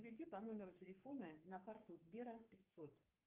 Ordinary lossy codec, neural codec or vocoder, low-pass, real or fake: Opus, 24 kbps; codec, 44.1 kHz, 3.4 kbps, Pupu-Codec; 3.6 kHz; fake